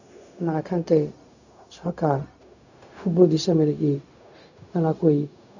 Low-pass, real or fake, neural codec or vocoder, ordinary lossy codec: 7.2 kHz; fake; codec, 16 kHz, 0.4 kbps, LongCat-Audio-Codec; none